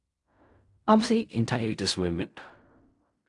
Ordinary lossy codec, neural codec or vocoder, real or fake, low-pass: AAC, 64 kbps; codec, 16 kHz in and 24 kHz out, 0.4 kbps, LongCat-Audio-Codec, fine tuned four codebook decoder; fake; 10.8 kHz